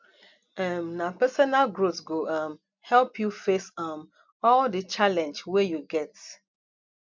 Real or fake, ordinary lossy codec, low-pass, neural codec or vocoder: real; MP3, 64 kbps; 7.2 kHz; none